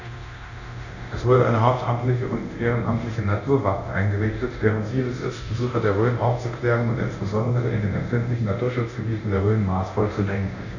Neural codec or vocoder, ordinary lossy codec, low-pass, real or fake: codec, 24 kHz, 0.9 kbps, DualCodec; none; 7.2 kHz; fake